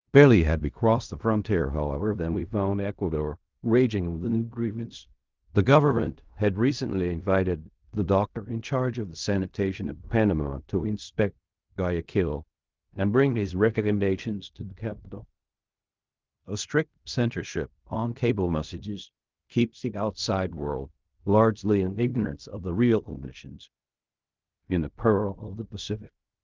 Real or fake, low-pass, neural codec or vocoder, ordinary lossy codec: fake; 7.2 kHz; codec, 16 kHz in and 24 kHz out, 0.4 kbps, LongCat-Audio-Codec, fine tuned four codebook decoder; Opus, 32 kbps